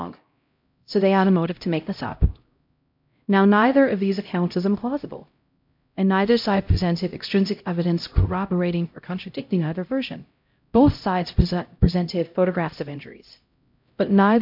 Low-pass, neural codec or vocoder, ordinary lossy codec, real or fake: 5.4 kHz; codec, 16 kHz, 0.5 kbps, X-Codec, HuBERT features, trained on LibriSpeech; MP3, 48 kbps; fake